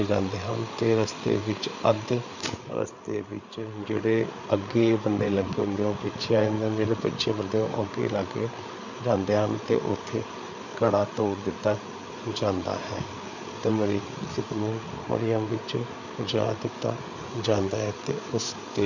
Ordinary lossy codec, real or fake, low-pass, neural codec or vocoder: none; fake; 7.2 kHz; codec, 16 kHz, 8 kbps, FreqCodec, larger model